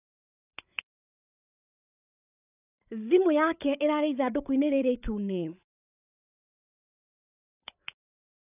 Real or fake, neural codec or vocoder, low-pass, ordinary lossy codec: fake; codec, 16 kHz, 8 kbps, FreqCodec, larger model; 3.6 kHz; none